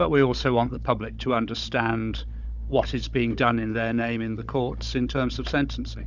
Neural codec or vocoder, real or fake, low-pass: codec, 16 kHz, 16 kbps, FunCodec, trained on Chinese and English, 50 frames a second; fake; 7.2 kHz